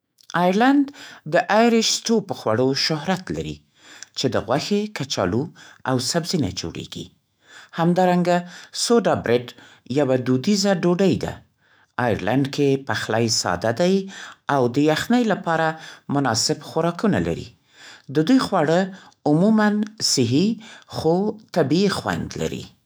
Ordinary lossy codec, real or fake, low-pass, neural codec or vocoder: none; fake; none; autoencoder, 48 kHz, 128 numbers a frame, DAC-VAE, trained on Japanese speech